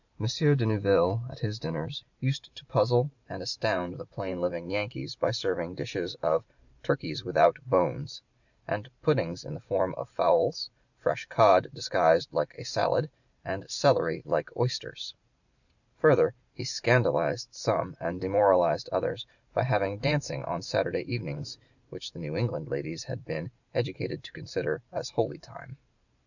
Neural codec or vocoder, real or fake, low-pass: vocoder, 44.1 kHz, 128 mel bands every 512 samples, BigVGAN v2; fake; 7.2 kHz